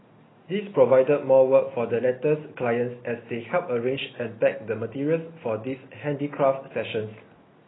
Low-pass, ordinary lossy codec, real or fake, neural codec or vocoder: 7.2 kHz; AAC, 16 kbps; real; none